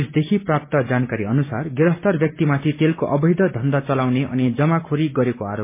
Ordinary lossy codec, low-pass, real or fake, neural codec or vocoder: MP3, 24 kbps; 3.6 kHz; real; none